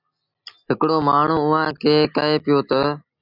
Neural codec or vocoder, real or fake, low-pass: none; real; 5.4 kHz